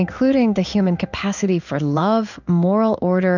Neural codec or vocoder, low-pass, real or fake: codec, 16 kHz in and 24 kHz out, 1 kbps, XY-Tokenizer; 7.2 kHz; fake